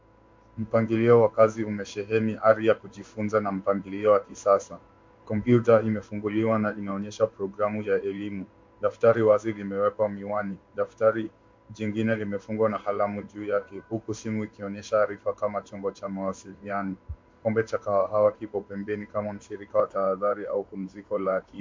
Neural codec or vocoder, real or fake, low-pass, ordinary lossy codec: codec, 16 kHz in and 24 kHz out, 1 kbps, XY-Tokenizer; fake; 7.2 kHz; MP3, 48 kbps